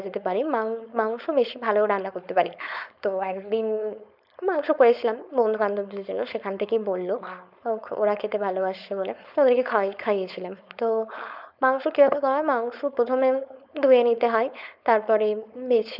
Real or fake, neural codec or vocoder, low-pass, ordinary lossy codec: fake; codec, 16 kHz, 4.8 kbps, FACodec; 5.4 kHz; none